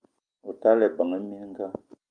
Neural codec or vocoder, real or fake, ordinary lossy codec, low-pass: none; real; Opus, 32 kbps; 9.9 kHz